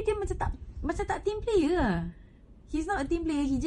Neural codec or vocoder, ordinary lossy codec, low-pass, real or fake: vocoder, 48 kHz, 128 mel bands, Vocos; MP3, 48 kbps; 19.8 kHz; fake